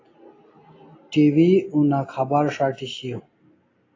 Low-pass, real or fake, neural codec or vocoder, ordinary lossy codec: 7.2 kHz; real; none; AAC, 32 kbps